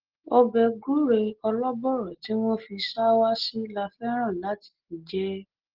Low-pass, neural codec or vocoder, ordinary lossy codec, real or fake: 5.4 kHz; none; Opus, 16 kbps; real